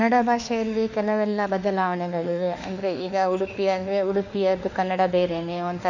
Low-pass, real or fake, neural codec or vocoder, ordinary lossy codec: 7.2 kHz; fake; autoencoder, 48 kHz, 32 numbers a frame, DAC-VAE, trained on Japanese speech; none